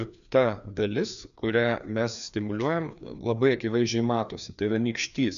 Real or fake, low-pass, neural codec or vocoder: fake; 7.2 kHz; codec, 16 kHz, 2 kbps, FreqCodec, larger model